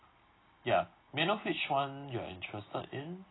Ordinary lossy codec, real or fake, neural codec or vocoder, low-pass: AAC, 16 kbps; real; none; 7.2 kHz